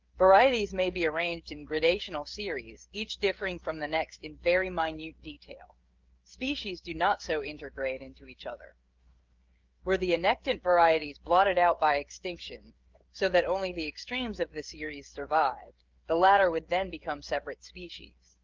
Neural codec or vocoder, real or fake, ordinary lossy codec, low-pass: codec, 16 kHz, 16 kbps, FreqCodec, smaller model; fake; Opus, 32 kbps; 7.2 kHz